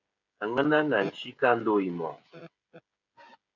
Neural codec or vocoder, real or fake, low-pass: codec, 16 kHz, 16 kbps, FreqCodec, smaller model; fake; 7.2 kHz